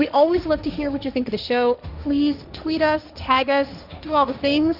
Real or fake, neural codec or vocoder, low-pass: fake; codec, 16 kHz, 1.1 kbps, Voila-Tokenizer; 5.4 kHz